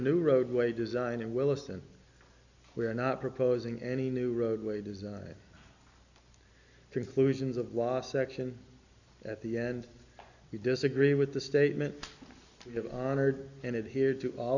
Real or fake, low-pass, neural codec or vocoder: real; 7.2 kHz; none